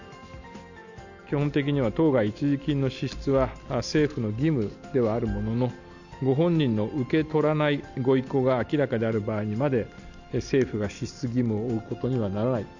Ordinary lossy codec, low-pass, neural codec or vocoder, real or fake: none; 7.2 kHz; none; real